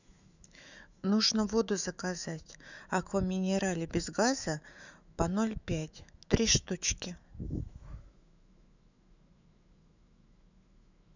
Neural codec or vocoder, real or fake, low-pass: autoencoder, 48 kHz, 128 numbers a frame, DAC-VAE, trained on Japanese speech; fake; 7.2 kHz